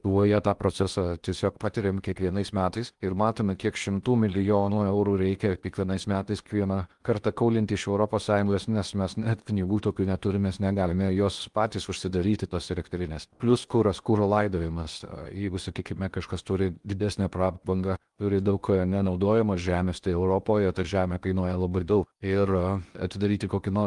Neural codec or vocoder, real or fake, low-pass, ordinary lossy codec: codec, 16 kHz in and 24 kHz out, 0.8 kbps, FocalCodec, streaming, 65536 codes; fake; 10.8 kHz; Opus, 32 kbps